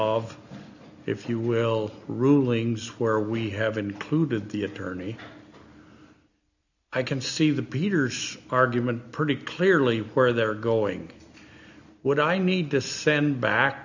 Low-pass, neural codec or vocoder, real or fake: 7.2 kHz; none; real